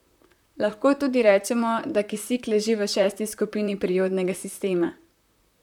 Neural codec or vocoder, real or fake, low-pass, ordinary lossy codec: vocoder, 44.1 kHz, 128 mel bands, Pupu-Vocoder; fake; 19.8 kHz; none